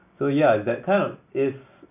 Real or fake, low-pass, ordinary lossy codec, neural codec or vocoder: real; 3.6 kHz; none; none